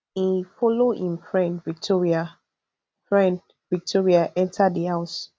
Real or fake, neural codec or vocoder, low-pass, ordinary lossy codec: real; none; none; none